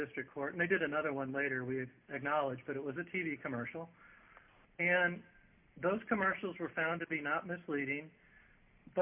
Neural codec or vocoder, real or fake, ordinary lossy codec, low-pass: none; real; MP3, 32 kbps; 3.6 kHz